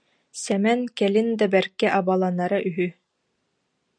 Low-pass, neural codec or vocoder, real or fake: 9.9 kHz; none; real